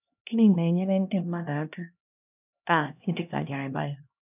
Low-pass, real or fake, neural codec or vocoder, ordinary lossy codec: 3.6 kHz; fake; codec, 16 kHz, 1 kbps, X-Codec, HuBERT features, trained on LibriSpeech; none